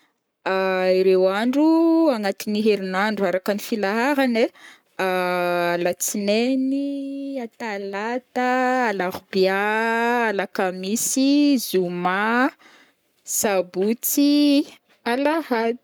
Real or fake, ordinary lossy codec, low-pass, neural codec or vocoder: fake; none; none; codec, 44.1 kHz, 7.8 kbps, Pupu-Codec